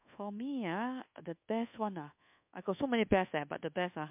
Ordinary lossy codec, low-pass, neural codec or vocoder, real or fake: MP3, 32 kbps; 3.6 kHz; codec, 24 kHz, 1.2 kbps, DualCodec; fake